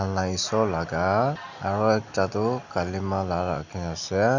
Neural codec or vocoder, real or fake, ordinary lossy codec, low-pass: none; real; none; 7.2 kHz